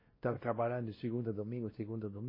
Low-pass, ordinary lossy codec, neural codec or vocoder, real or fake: 5.4 kHz; MP3, 24 kbps; codec, 16 kHz, 1 kbps, X-Codec, WavLM features, trained on Multilingual LibriSpeech; fake